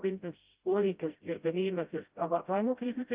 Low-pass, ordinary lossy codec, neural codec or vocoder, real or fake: 3.6 kHz; Opus, 24 kbps; codec, 16 kHz, 0.5 kbps, FreqCodec, smaller model; fake